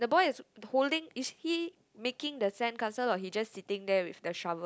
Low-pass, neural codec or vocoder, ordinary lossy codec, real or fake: none; none; none; real